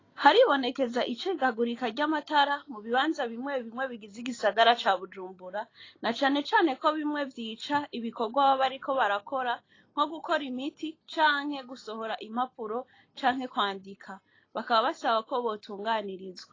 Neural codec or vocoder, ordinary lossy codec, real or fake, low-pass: none; AAC, 32 kbps; real; 7.2 kHz